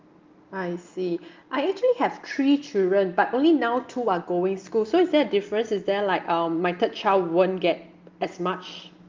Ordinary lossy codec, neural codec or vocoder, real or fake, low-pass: Opus, 32 kbps; none; real; 7.2 kHz